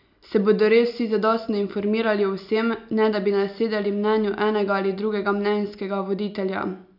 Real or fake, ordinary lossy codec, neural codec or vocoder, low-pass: real; none; none; 5.4 kHz